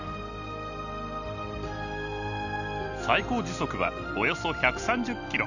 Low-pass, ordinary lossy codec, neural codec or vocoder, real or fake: 7.2 kHz; none; none; real